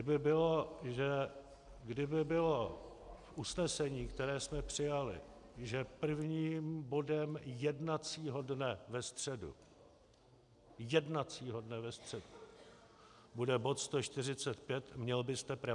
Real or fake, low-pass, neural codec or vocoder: real; 10.8 kHz; none